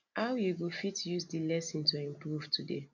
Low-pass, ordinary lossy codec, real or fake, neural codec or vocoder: 7.2 kHz; none; real; none